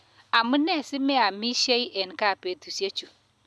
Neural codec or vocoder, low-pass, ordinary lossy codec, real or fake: none; none; none; real